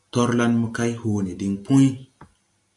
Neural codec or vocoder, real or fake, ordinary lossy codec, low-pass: none; real; AAC, 64 kbps; 10.8 kHz